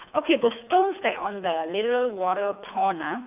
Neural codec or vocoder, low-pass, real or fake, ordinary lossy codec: codec, 24 kHz, 3 kbps, HILCodec; 3.6 kHz; fake; AAC, 32 kbps